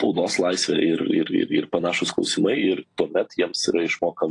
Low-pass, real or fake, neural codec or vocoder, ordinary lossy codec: 10.8 kHz; fake; vocoder, 44.1 kHz, 128 mel bands every 256 samples, BigVGAN v2; AAC, 48 kbps